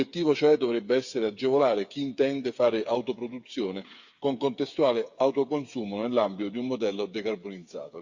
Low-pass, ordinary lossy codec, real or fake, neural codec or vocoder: 7.2 kHz; Opus, 64 kbps; fake; codec, 16 kHz, 8 kbps, FreqCodec, smaller model